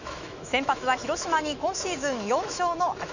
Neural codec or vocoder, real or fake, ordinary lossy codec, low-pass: autoencoder, 48 kHz, 128 numbers a frame, DAC-VAE, trained on Japanese speech; fake; none; 7.2 kHz